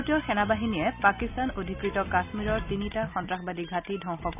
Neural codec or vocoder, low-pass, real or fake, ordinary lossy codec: none; 3.6 kHz; real; none